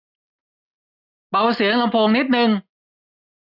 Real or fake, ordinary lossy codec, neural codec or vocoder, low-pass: real; none; none; 5.4 kHz